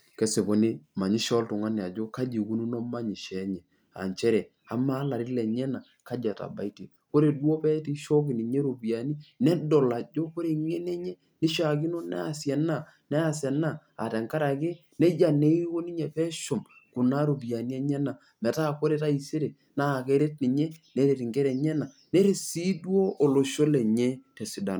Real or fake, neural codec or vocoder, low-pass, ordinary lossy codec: real; none; none; none